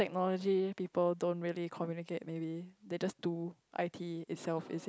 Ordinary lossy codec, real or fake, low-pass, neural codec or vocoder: none; real; none; none